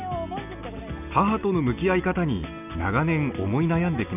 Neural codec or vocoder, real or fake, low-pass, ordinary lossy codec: none; real; 3.6 kHz; none